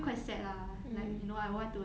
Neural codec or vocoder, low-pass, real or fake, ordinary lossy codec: none; none; real; none